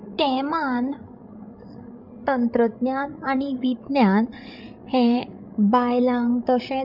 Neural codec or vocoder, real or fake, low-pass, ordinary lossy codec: codec, 16 kHz, 8 kbps, FreqCodec, larger model; fake; 5.4 kHz; none